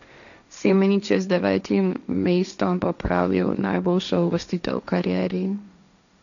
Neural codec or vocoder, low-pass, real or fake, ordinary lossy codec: codec, 16 kHz, 1.1 kbps, Voila-Tokenizer; 7.2 kHz; fake; none